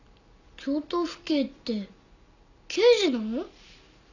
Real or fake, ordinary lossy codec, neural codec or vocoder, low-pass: real; none; none; 7.2 kHz